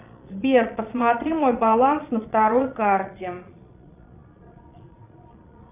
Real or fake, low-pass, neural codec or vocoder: fake; 3.6 kHz; vocoder, 22.05 kHz, 80 mel bands, Vocos